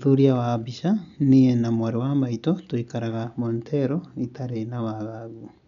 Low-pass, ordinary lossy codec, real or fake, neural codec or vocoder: 7.2 kHz; none; real; none